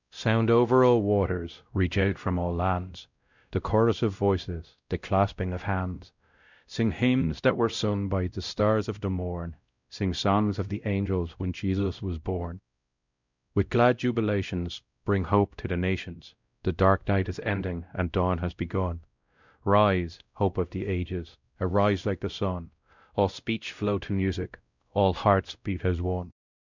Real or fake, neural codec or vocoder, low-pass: fake; codec, 16 kHz, 0.5 kbps, X-Codec, WavLM features, trained on Multilingual LibriSpeech; 7.2 kHz